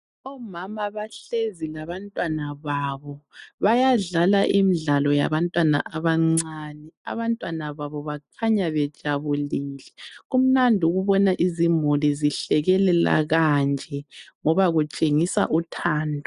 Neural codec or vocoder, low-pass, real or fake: none; 10.8 kHz; real